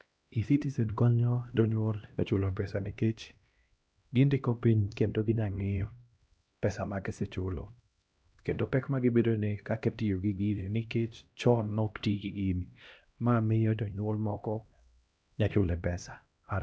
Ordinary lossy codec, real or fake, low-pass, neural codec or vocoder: none; fake; none; codec, 16 kHz, 1 kbps, X-Codec, HuBERT features, trained on LibriSpeech